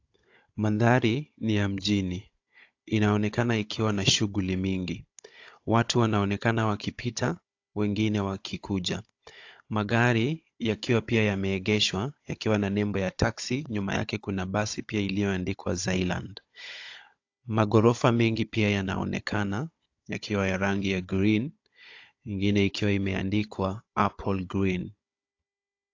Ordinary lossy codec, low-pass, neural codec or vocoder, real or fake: AAC, 48 kbps; 7.2 kHz; codec, 16 kHz, 16 kbps, FunCodec, trained on Chinese and English, 50 frames a second; fake